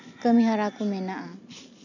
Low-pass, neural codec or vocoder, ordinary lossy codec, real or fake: 7.2 kHz; none; none; real